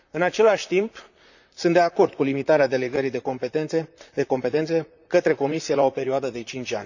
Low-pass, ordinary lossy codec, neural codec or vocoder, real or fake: 7.2 kHz; none; vocoder, 44.1 kHz, 128 mel bands, Pupu-Vocoder; fake